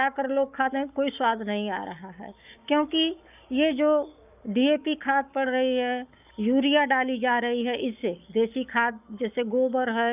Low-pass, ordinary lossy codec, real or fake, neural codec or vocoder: 3.6 kHz; none; fake; codec, 44.1 kHz, 7.8 kbps, Pupu-Codec